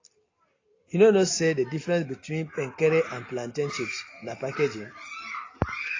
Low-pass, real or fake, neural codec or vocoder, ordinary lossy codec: 7.2 kHz; real; none; AAC, 32 kbps